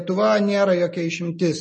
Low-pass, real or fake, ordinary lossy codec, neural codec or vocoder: 10.8 kHz; real; MP3, 32 kbps; none